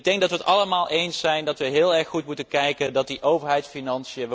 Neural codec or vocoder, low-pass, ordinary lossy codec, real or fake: none; none; none; real